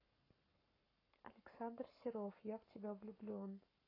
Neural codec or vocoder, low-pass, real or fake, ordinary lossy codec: codec, 44.1 kHz, 7.8 kbps, Pupu-Codec; 5.4 kHz; fake; AAC, 24 kbps